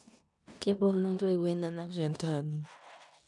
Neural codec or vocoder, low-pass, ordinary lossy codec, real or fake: codec, 16 kHz in and 24 kHz out, 0.9 kbps, LongCat-Audio-Codec, four codebook decoder; 10.8 kHz; none; fake